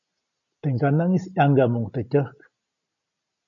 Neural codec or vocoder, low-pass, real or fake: none; 7.2 kHz; real